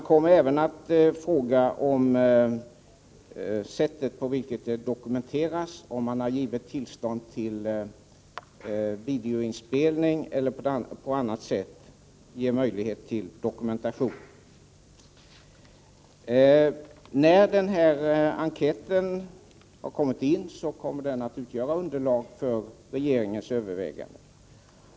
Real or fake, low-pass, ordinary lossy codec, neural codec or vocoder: real; none; none; none